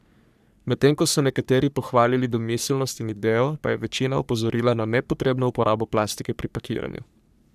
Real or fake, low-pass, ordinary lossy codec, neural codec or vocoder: fake; 14.4 kHz; none; codec, 44.1 kHz, 3.4 kbps, Pupu-Codec